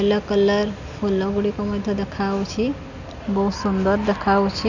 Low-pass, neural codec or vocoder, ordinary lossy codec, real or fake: 7.2 kHz; none; none; real